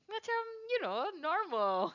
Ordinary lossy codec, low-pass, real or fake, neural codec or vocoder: none; 7.2 kHz; real; none